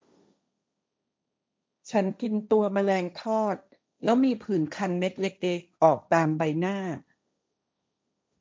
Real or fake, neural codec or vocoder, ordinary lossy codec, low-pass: fake; codec, 16 kHz, 1.1 kbps, Voila-Tokenizer; none; none